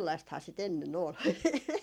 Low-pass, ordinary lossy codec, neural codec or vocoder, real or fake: 19.8 kHz; MP3, 96 kbps; vocoder, 44.1 kHz, 128 mel bands every 256 samples, BigVGAN v2; fake